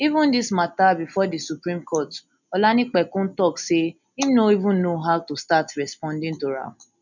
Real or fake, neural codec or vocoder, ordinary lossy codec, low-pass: real; none; none; 7.2 kHz